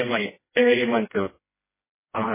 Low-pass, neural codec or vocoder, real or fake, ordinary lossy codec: 3.6 kHz; codec, 16 kHz, 1 kbps, FreqCodec, smaller model; fake; MP3, 16 kbps